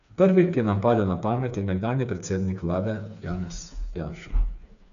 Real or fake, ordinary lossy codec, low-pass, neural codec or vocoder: fake; none; 7.2 kHz; codec, 16 kHz, 4 kbps, FreqCodec, smaller model